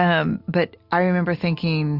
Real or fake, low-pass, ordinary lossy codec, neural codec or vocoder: real; 5.4 kHz; Opus, 64 kbps; none